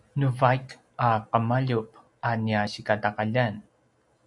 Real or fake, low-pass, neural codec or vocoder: real; 10.8 kHz; none